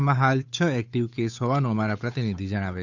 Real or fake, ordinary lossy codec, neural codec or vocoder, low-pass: fake; none; codec, 16 kHz, 8 kbps, FunCodec, trained on Chinese and English, 25 frames a second; 7.2 kHz